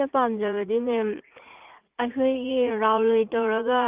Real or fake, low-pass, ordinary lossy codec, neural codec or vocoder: fake; 3.6 kHz; Opus, 24 kbps; vocoder, 44.1 kHz, 80 mel bands, Vocos